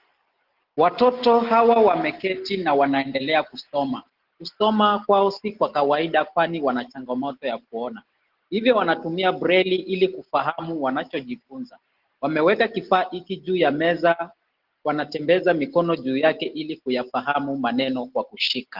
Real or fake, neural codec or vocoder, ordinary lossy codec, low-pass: real; none; Opus, 16 kbps; 5.4 kHz